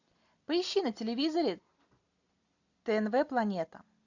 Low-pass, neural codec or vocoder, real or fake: 7.2 kHz; none; real